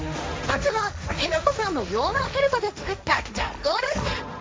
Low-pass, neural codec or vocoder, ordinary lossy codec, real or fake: none; codec, 16 kHz, 1.1 kbps, Voila-Tokenizer; none; fake